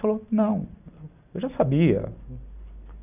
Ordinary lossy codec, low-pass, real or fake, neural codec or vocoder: none; 3.6 kHz; real; none